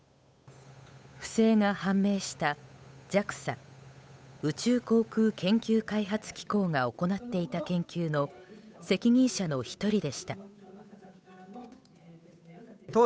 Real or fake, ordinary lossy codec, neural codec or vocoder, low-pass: fake; none; codec, 16 kHz, 8 kbps, FunCodec, trained on Chinese and English, 25 frames a second; none